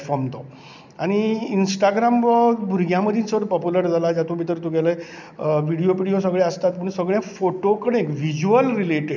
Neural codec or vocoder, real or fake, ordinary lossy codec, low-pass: none; real; none; 7.2 kHz